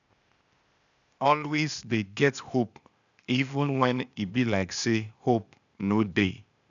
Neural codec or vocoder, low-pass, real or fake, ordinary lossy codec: codec, 16 kHz, 0.8 kbps, ZipCodec; 7.2 kHz; fake; none